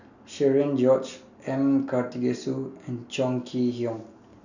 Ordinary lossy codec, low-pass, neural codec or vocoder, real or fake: none; 7.2 kHz; none; real